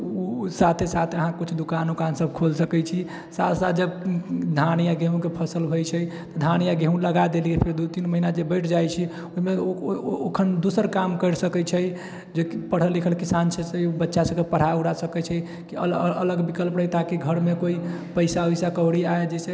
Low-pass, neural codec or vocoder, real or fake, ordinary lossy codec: none; none; real; none